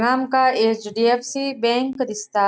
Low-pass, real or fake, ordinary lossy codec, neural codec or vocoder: none; real; none; none